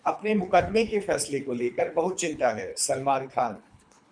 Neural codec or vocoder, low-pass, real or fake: codec, 24 kHz, 3 kbps, HILCodec; 9.9 kHz; fake